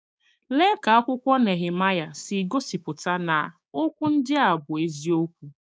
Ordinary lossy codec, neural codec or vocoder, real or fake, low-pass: none; codec, 16 kHz, 6 kbps, DAC; fake; none